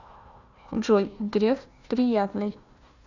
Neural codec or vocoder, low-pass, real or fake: codec, 16 kHz, 1 kbps, FunCodec, trained on Chinese and English, 50 frames a second; 7.2 kHz; fake